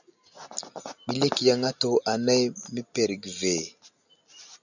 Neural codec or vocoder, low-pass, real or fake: none; 7.2 kHz; real